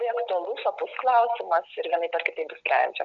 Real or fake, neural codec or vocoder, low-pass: real; none; 7.2 kHz